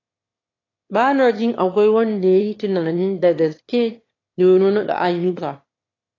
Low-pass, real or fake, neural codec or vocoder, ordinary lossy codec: 7.2 kHz; fake; autoencoder, 22.05 kHz, a latent of 192 numbers a frame, VITS, trained on one speaker; AAC, 32 kbps